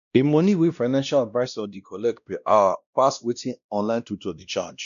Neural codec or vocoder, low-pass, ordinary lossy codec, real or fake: codec, 16 kHz, 1 kbps, X-Codec, WavLM features, trained on Multilingual LibriSpeech; 7.2 kHz; none; fake